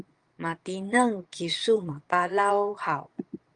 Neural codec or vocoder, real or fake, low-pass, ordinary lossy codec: vocoder, 22.05 kHz, 80 mel bands, Vocos; fake; 9.9 kHz; Opus, 32 kbps